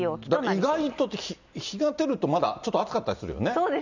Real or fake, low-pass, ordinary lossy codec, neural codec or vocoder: real; 7.2 kHz; none; none